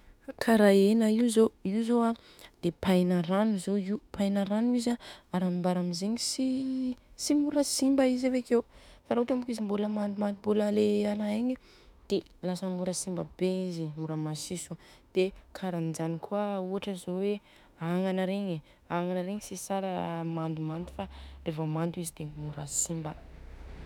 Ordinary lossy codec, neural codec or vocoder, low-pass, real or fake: none; autoencoder, 48 kHz, 32 numbers a frame, DAC-VAE, trained on Japanese speech; 19.8 kHz; fake